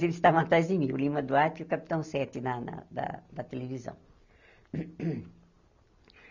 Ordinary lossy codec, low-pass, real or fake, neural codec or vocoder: none; 7.2 kHz; real; none